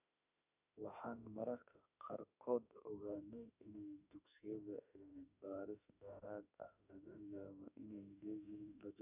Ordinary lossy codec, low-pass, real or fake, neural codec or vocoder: none; 3.6 kHz; fake; autoencoder, 48 kHz, 32 numbers a frame, DAC-VAE, trained on Japanese speech